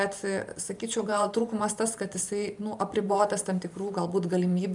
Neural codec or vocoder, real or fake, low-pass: vocoder, 44.1 kHz, 128 mel bands every 512 samples, BigVGAN v2; fake; 10.8 kHz